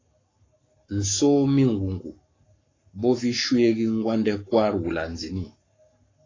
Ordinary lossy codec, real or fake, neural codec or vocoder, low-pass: AAC, 32 kbps; fake; codec, 44.1 kHz, 7.8 kbps, Pupu-Codec; 7.2 kHz